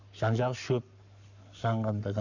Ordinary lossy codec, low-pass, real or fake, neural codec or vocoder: none; 7.2 kHz; fake; codec, 44.1 kHz, 7.8 kbps, Pupu-Codec